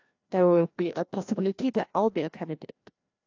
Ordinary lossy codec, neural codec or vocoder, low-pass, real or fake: AAC, 48 kbps; codec, 16 kHz, 1 kbps, FreqCodec, larger model; 7.2 kHz; fake